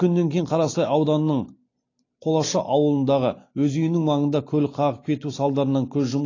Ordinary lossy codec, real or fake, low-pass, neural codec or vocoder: AAC, 32 kbps; real; 7.2 kHz; none